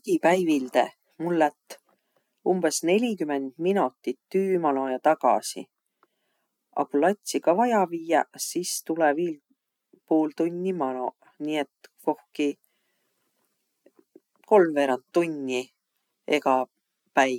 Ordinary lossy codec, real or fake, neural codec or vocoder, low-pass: none; real; none; 19.8 kHz